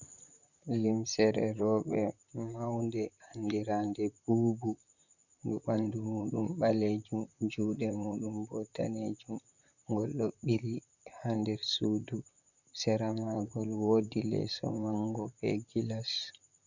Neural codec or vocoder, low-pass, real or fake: vocoder, 44.1 kHz, 128 mel bands, Pupu-Vocoder; 7.2 kHz; fake